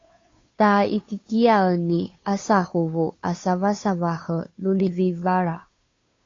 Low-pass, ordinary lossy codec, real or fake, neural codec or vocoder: 7.2 kHz; AAC, 32 kbps; fake; codec, 16 kHz, 2 kbps, FunCodec, trained on Chinese and English, 25 frames a second